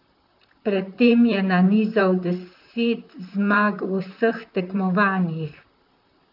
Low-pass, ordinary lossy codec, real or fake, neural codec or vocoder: 5.4 kHz; none; fake; vocoder, 44.1 kHz, 128 mel bands, Pupu-Vocoder